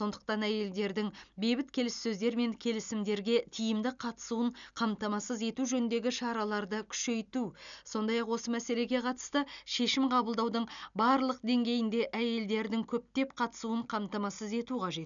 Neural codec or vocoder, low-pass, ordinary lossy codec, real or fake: none; 7.2 kHz; none; real